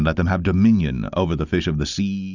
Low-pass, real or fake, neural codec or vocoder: 7.2 kHz; real; none